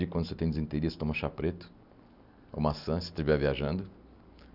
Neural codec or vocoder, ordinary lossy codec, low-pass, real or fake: none; none; 5.4 kHz; real